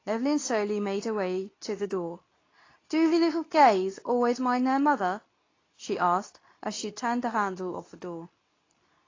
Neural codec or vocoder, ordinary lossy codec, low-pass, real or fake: codec, 24 kHz, 0.9 kbps, WavTokenizer, medium speech release version 2; AAC, 32 kbps; 7.2 kHz; fake